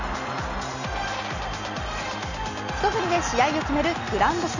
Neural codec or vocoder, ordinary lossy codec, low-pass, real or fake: none; none; 7.2 kHz; real